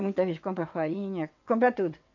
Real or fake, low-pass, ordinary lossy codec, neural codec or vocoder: fake; 7.2 kHz; none; vocoder, 22.05 kHz, 80 mel bands, WaveNeXt